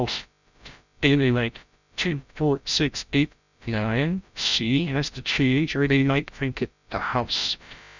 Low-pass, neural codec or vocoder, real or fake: 7.2 kHz; codec, 16 kHz, 0.5 kbps, FreqCodec, larger model; fake